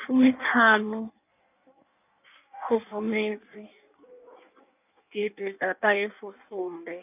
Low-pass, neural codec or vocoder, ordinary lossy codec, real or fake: 3.6 kHz; codec, 16 kHz in and 24 kHz out, 1.1 kbps, FireRedTTS-2 codec; none; fake